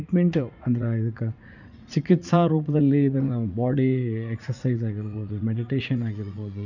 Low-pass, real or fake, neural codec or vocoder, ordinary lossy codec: 7.2 kHz; fake; vocoder, 44.1 kHz, 80 mel bands, Vocos; none